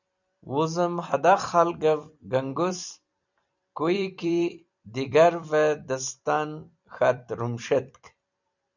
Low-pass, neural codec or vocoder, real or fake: 7.2 kHz; vocoder, 44.1 kHz, 128 mel bands every 256 samples, BigVGAN v2; fake